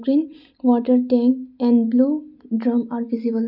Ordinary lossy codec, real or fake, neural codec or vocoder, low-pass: none; real; none; 5.4 kHz